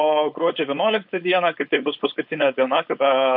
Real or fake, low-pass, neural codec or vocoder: fake; 5.4 kHz; codec, 16 kHz, 4.8 kbps, FACodec